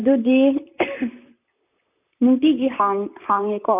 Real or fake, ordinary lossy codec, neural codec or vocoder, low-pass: fake; none; vocoder, 44.1 kHz, 128 mel bands, Pupu-Vocoder; 3.6 kHz